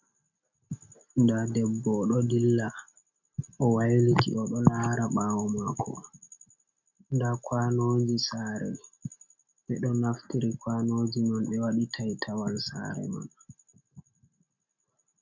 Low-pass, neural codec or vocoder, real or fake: 7.2 kHz; none; real